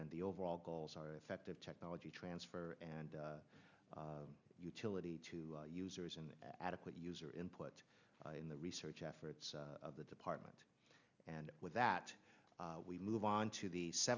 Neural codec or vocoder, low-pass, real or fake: none; 7.2 kHz; real